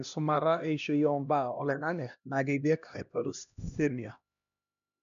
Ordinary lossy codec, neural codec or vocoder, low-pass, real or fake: none; codec, 16 kHz, 1 kbps, X-Codec, HuBERT features, trained on LibriSpeech; 7.2 kHz; fake